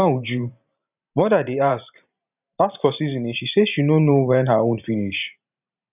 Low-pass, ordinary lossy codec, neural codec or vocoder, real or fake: 3.6 kHz; none; vocoder, 44.1 kHz, 128 mel bands every 512 samples, BigVGAN v2; fake